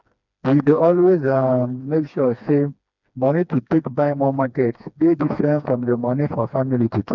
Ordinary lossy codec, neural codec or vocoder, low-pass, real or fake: none; codec, 16 kHz, 2 kbps, FreqCodec, smaller model; 7.2 kHz; fake